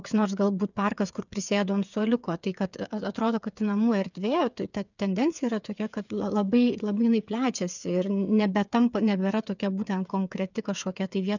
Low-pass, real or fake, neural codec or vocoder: 7.2 kHz; fake; codec, 16 kHz, 8 kbps, FreqCodec, smaller model